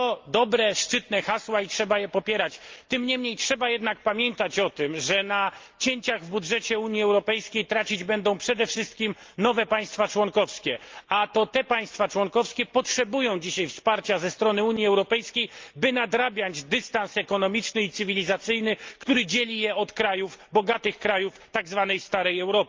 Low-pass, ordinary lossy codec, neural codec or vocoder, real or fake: 7.2 kHz; Opus, 32 kbps; none; real